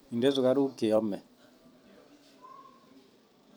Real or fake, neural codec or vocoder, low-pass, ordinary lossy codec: fake; vocoder, 44.1 kHz, 128 mel bands every 256 samples, BigVGAN v2; none; none